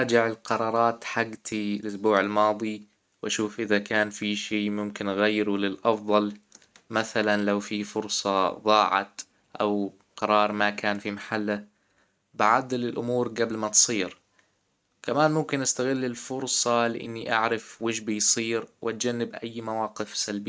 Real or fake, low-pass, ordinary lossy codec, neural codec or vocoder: real; none; none; none